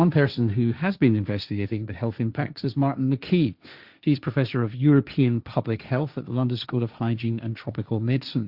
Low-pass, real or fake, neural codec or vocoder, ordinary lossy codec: 5.4 kHz; fake; codec, 16 kHz, 1.1 kbps, Voila-Tokenizer; Opus, 64 kbps